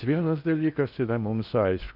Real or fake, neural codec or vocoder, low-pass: fake; codec, 16 kHz in and 24 kHz out, 0.6 kbps, FocalCodec, streaming, 4096 codes; 5.4 kHz